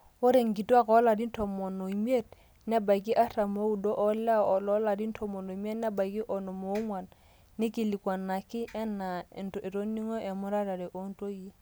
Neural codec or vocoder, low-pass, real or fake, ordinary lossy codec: none; none; real; none